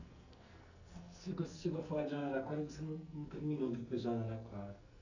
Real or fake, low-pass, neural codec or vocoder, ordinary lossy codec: fake; 7.2 kHz; codec, 44.1 kHz, 2.6 kbps, SNAC; none